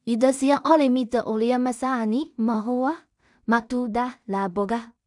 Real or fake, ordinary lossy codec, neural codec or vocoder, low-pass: fake; none; codec, 16 kHz in and 24 kHz out, 0.4 kbps, LongCat-Audio-Codec, two codebook decoder; 10.8 kHz